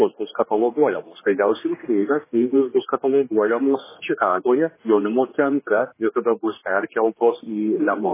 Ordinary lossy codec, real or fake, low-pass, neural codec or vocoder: MP3, 16 kbps; fake; 3.6 kHz; codec, 16 kHz, 2 kbps, X-Codec, HuBERT features, trained on general audio